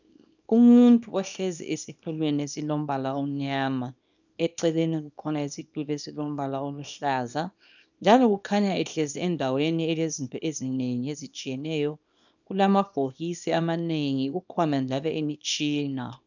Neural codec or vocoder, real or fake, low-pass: codec, 24 kHz, 0.9 kbps, WavTokenizer, small release; fake; 7.2 kHz